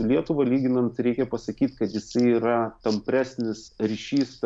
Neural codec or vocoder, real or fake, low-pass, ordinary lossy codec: none; real; 9.9 kHz; MP3, 96 kbps